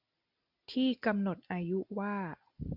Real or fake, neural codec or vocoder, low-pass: real; none; 5.4 kHz